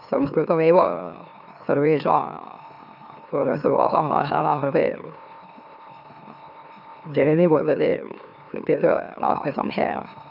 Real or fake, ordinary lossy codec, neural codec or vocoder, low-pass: fake; none; autoencoder, 44.1 kHz, a latent of 192 numbers a frame, MeloTTS; 5.4 kHz